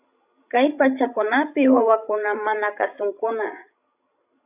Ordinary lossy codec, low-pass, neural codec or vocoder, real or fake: AAC, 32 kbps; 3.6 kHz; codec, 16 kHz, 16 kbps, FreqCodec, larger model; fake